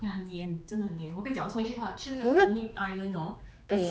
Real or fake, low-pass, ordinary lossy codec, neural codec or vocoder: fake; none; none; codec, 16 kHz, 2 kbps, X-Codec, HuBERT features, trained on general audio